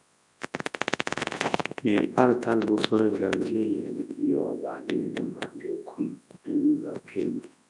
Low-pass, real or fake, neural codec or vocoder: 10.8 kHz; fake; codec, 24 kHz, 0.9 kbps, WavTokenizer, large speech release